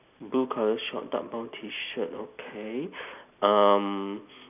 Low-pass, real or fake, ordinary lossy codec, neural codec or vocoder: 3.6 kHz; real; none; none